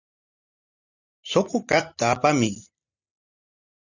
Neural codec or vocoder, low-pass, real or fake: none; 7.2 kHz; real